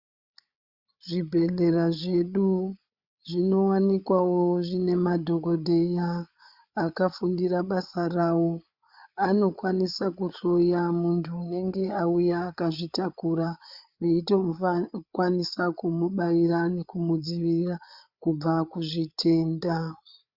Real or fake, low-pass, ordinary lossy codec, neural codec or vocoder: fake; 5.4 kHz; Opus, 64 kbps; codec, 16 kHz, 8 kbps, FreqCodec, larger model